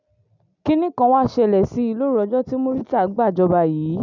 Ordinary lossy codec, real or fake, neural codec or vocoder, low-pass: none; real; none; 7.2 kHz